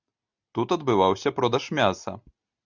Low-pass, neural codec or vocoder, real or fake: 7.2 kHz; none; real